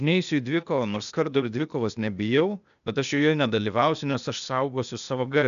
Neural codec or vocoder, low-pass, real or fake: codec, 16 kHz, 0.8 kbps, ZipCodec; 7.2 kHz; fake